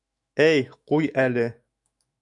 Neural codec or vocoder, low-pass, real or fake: autoencoder, 48 kHz, 128 numbers a frame, DAC-VAE, trained on Japanese speech; 10.8 kHz; fake